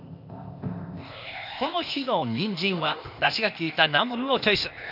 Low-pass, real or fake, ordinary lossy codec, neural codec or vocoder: 5.4 kHz; fake; none; codec, 16 kHz, 0.8 kbps, ZipCodec